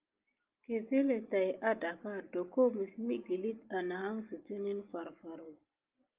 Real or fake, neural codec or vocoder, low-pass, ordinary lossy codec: real; none; 3.6 kHz; Opus, 32 kbps